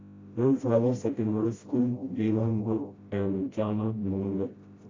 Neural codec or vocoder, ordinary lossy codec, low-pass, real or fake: codec, 16 kHz, 0.5 kbps, FreqCodec, smaller model; AAC, 32 kbps; 7.2 kHz; fake